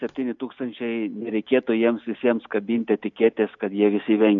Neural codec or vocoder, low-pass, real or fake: none; 7.2 kHz; real